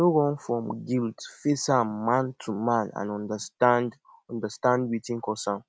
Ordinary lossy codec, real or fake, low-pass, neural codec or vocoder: none; real; none; none